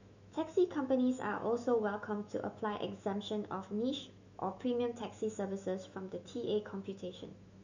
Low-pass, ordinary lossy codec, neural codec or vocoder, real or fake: 7.2 kHz; none; autoencoder, 48 kHz, 128 numbers a frame, DAC-VAE, trained on Japanese speech; fake